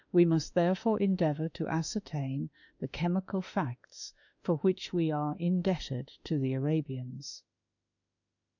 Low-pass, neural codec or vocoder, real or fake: 7.2 kHz; autoencoder, 48 kHz, 32 numbers a frame, DAC-VAE, trained on Japanese speech; fake